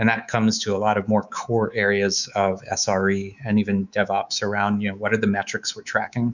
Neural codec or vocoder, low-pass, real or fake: codec, 24 kHz, 3.1 kbps, DualCodec; 7.2 kHz; fake